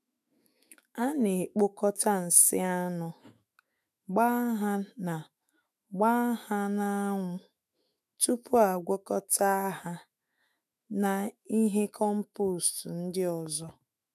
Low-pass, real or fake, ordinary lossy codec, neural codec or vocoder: 14.4 kHz; fake; none; autoencoder, 48 kHz, 128 numbers a frame, DAC-VAE, trained on Japanese speech